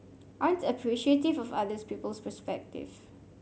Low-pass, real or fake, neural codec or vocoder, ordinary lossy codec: none; real; none; none